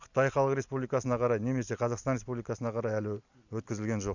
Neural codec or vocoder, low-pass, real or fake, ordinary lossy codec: none; 7.2 kHz; real; none